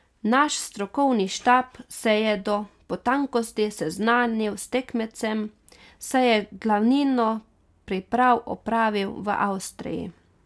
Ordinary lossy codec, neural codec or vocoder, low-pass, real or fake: none; none; none; real